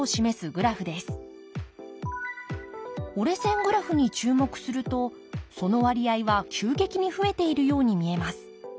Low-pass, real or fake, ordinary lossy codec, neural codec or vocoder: none; real; none; none